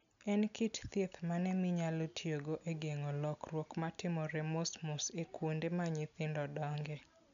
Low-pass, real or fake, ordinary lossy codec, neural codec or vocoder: 7.2 kHz; real; none; none